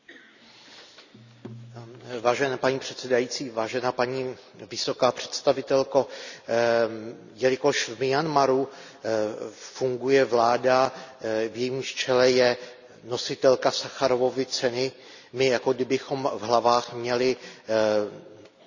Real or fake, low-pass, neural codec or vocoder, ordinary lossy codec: real; 7.2 kHz; none; none